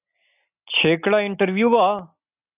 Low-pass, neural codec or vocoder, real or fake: 3.6 kHz; none; real